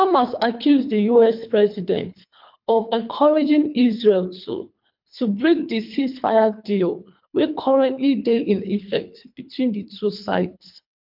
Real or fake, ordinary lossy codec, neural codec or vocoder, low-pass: fake; MP3, 48 kbps; codec, 24 kHz, 3 kbps, HILCodec; 5.4 kHz